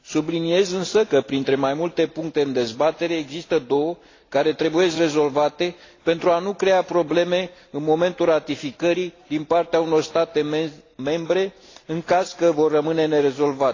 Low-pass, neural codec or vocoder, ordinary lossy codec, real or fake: 7.2 kHz; none; AAC, 32 kbps; real